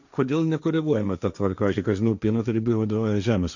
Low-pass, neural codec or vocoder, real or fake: 7.2 kHz; codec, 16 kHz, 1.1 kbps, Voila-Tokenizer; fake